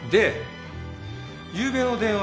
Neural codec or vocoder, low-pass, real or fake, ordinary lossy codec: none; none; real; none